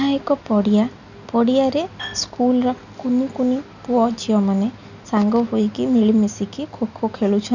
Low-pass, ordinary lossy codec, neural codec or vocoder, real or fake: 7.2 kHz; none; none; real